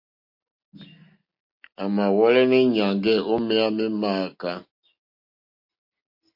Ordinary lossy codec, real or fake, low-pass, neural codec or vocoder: MP3, 32 kbps; real; 5.4 kHz; none